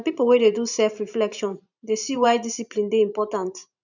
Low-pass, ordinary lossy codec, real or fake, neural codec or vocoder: 7.2 kHz; none; fake; vocoder, 44.1 kHz, 128 mel bands every 512 samples, BigVGAN v2